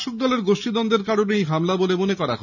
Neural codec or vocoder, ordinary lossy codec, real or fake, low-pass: none; none; real; 7.2 kHz